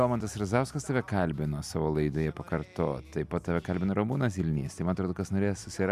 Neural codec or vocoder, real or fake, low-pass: none; real; 14.4 kHz